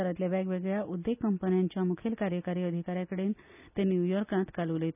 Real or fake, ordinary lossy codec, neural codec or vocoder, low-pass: real; none; none; 3.6 kHz